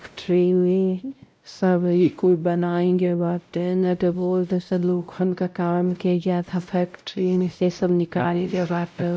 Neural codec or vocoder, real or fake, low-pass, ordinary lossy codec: codec, 16 kHz, 0.5 kbps, X-Codec, WavLM features, trained on Multilingual LibriSpeech; fake; none; none